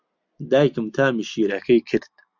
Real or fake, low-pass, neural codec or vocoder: real; 7.2 kHz; none